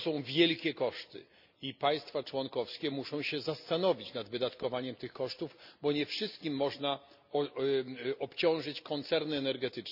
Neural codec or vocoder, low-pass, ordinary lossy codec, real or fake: none; 5.4 kHz; none; real